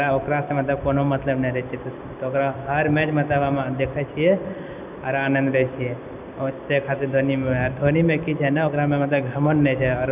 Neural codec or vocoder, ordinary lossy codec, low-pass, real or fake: none; none; 3.6 kHz; real